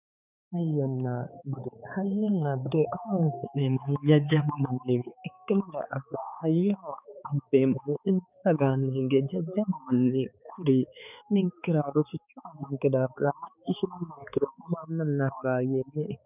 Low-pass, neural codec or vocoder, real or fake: 3.6 kHz; codec, 16 kHz, 4 kbps, X-Codec, HuBERT features, trained on balanced general audio; fake